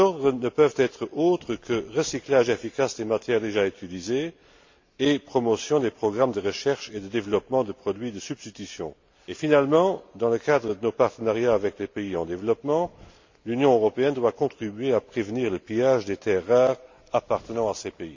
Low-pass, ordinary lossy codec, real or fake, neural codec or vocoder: 7.2 kHz; MP3, 48 kbps; real; none